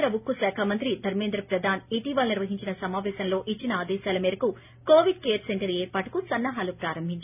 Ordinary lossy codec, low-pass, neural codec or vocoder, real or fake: none; 3.6 kHz; none; real